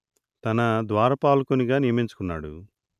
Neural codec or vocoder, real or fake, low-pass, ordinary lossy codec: none; real; 14.4 kHz; none